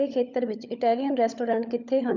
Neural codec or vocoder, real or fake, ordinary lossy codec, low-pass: codec, 16 kHz, 16 kbps, FunCodec, trained on LibriTTS, 50 frames a second; fake; none; 7.2 kHz